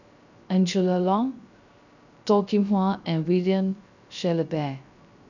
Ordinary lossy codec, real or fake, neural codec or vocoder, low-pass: none; fake; codec, 16 kHz, 0.3 kbps, FocalCodec; 7.2 kHz